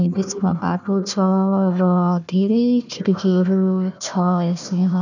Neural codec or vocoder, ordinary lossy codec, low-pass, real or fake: codec, 16 kHz, 1 kbps, FunCodec, trained on Chinese and English, 50 frames a second; none; 7.2 kHz; fake